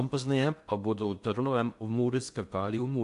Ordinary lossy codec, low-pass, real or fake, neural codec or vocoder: MP3, 64 kbps; 10.8 kHz; fake; codec, 16 kHz in and 24 kHz out, 0.6 kbps, FocalCodec, streaming, 2048 codes